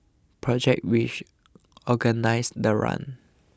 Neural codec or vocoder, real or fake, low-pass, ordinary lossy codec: none; real; none; none